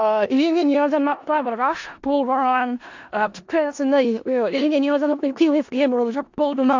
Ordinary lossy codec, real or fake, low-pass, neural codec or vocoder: AAC, 48 kbps; fake; 7.2 kHz; codec, 16 kHz in and 24 kHz out, 0.4 kbps, LongCat-Audio-Codec, four codebook decoder